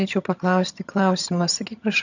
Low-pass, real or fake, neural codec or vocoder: 7.2 kHz; fake; vocoder, 22.05 kHz, 80 mel bands, HiFi-GAN